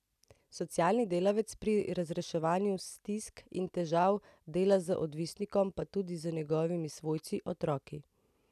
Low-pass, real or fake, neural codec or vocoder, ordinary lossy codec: 14.4 kHz; real; none; none